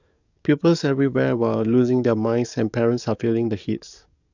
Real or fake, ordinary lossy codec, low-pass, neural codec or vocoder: fake; none; 7.2 kHz; codec, 44.1 kHz, 7.8 kbps, DAC